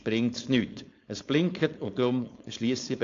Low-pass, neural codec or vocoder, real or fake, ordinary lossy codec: 7.2 kHz; codec, 16 kHz, 4.8 kbps, FACodec; fake; AAC, 48 kbps